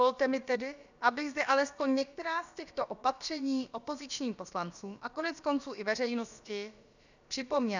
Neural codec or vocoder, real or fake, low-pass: codec, 16 kHz, about 1 kbps, DyCAST, with the encoder's durations; fake; 7.2 kHz